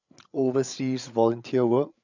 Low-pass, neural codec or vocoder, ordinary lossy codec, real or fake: 7.2 kHz; codec, 16 kHz, 8 kbps, FreqCodec, larger model; none; fake